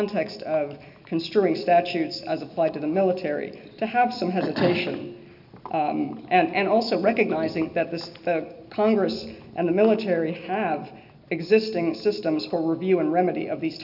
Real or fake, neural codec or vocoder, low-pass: real; none; 5.4 kHz